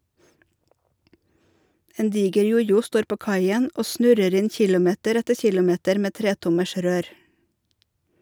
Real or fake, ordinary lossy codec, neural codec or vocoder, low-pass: real; none; none; none